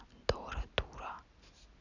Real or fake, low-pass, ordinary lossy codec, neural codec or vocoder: real; 7.2 kHz; none; none